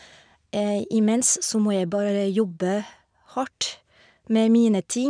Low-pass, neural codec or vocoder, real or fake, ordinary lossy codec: 9.9 kHz; none; real; none